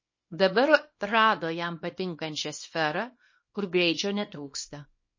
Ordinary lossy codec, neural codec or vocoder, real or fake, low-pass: MP3, 32 kbps; codec, 24 kHz, 0.9 kbps, WavTokenizer, small release; fake; 7.2 kHz